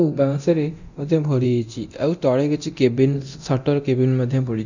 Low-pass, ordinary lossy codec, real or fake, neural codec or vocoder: 7.2 kHz; none; fake; codec, 24 kHz, 0.9 kbps, DualCodec